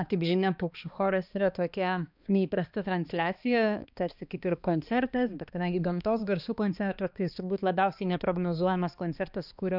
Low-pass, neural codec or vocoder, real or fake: 5.4 kHz; codec, 16 kHz, 2 kbps, X-Codec, HuBERT features, trained on balanced general audio; fake